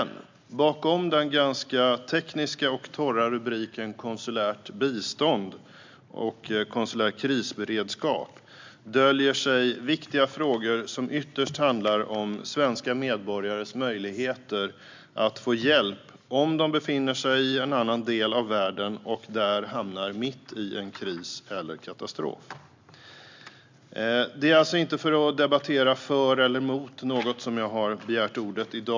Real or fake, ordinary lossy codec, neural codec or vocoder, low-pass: real; MP3, 64 kbps; none; 7.2 kHz